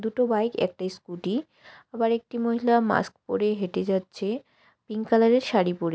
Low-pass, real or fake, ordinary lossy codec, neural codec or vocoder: none; real; none; none